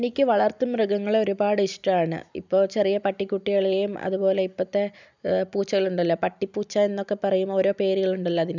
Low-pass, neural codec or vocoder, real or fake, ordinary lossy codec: 7.2 kHz; none; real; none